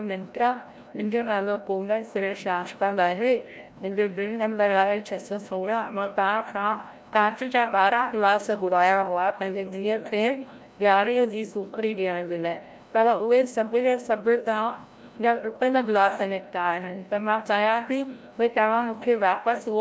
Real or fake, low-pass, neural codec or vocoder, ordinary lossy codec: fake; none; codec, 16 kHz, 0.5 kbps, FreqCodec, larger model; none